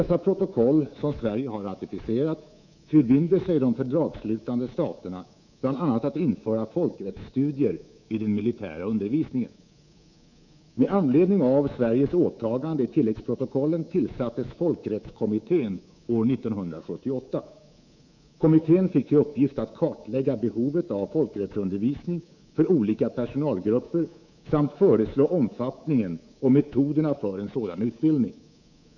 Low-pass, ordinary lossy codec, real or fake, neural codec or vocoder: 7.2 kHz; none; fake; codec, 24 kHz, 3.1 kbps, DualCodec